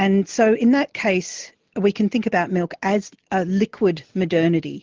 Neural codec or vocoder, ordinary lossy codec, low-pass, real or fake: none; Opus, 16 kbps; 7.2 kHz; real